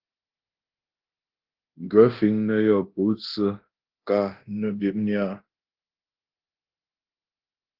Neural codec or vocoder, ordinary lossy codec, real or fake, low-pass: codec, 24 kHz, 0.9 kbps, DualCodec; Opus, 16 kbps; fake; 5.4 kHz